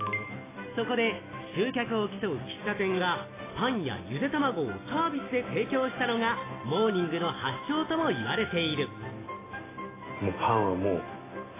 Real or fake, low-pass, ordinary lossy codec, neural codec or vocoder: real; 3.6 kHz; AAC, 16 kbps; none